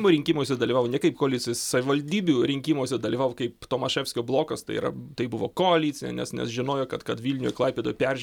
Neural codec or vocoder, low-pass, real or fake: none; 19.8 kHz; real